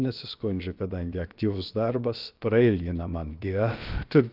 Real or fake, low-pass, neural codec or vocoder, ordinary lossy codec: fake; 5.4 kHz; codec, 16 kHz, about 1 kbps, DyCAST, with the encoder's durations; Opus, 24 kbps